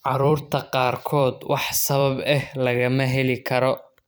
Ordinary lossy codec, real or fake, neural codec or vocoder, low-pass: none; fake; vocoder, 44.1 kHz, 128 mel bands every 256 samples, BigVGAN v2; none